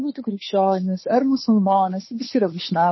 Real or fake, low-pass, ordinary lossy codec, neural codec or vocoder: fake; 7.2 kHz; MP3, 24 kbps; codec, 16 kHz, 2 kbps, FunCodec, trained on Chinese and English, 25 frames a second